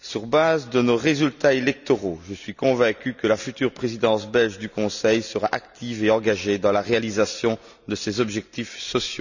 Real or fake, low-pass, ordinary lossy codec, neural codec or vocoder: real; 7.2 kHz; none; none